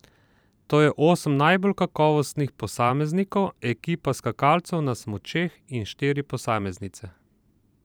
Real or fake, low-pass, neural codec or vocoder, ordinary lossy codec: fake; none; vocoder, 44.1 kHz, 128 mel bands every 512 samples, BigVGAN v2; none